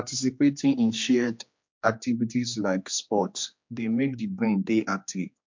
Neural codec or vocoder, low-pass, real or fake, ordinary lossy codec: codec, 16 kHz, 2 kbps, X-Codec, HuBERT features, trained on general audio; 7.2 kHz; fake; MP3, 48 kbps